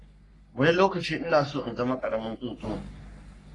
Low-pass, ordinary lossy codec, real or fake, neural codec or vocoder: 10.8 kHz; AAC, 32 kbps; fake; codec, 44.1 kHz, 3.4 kbps, Pupu-Codec